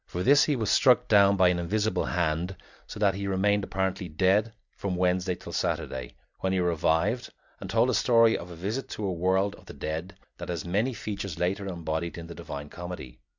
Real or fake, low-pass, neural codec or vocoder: real; 7.2 kHz; none